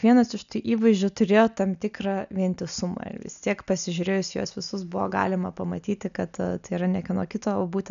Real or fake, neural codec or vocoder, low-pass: real; none; 7.2 kHz